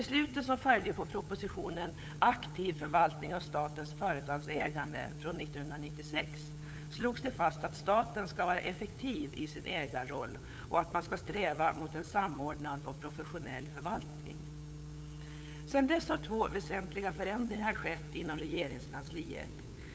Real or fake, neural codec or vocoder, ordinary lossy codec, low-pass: fake; codec, 16 kHz, 8 kbps, FunCodec, trained on LibriTTS, 25 frames a second; none; none